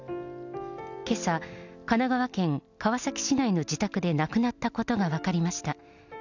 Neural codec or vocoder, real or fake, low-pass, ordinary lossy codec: none; real; 7.2 kHz; none